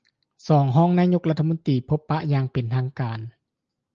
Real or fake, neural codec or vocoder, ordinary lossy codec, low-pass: real; none; Opus, 24 kbps; 7.2 kHz